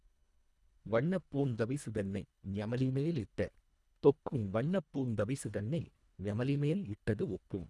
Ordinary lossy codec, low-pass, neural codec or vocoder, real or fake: none; none; codec, 24 kHz, 1.5 kbps, HILCodec; fake